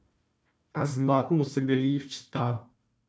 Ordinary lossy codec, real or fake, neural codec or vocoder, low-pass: none; fake; codec, 16 kHz, 1 kbps, FunCodec, trained on Chinese and English, 50 frames a second; none